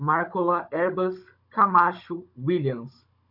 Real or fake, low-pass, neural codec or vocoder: fake; 5.4 kHz; codec, 16 kHz, 16 kbps, FunCodec, trained on Chinese and English, 50 frames a second